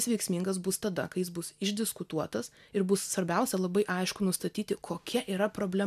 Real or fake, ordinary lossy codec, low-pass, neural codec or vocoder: real; AAC, 96 kbps; 14.4 kHz; none